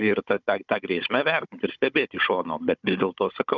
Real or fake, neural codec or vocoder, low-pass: fake; codec, 16 kHz, 16 kbps, FunCodec, trained on Chinese and English, 50 frames a second; 7.2 kHz